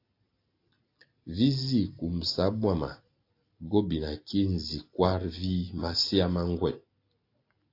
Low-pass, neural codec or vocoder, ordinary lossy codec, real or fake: 5.4 kHz; none; AAC, 32 kbps; real